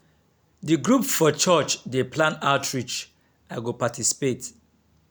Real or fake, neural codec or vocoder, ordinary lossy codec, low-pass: real; none; none; none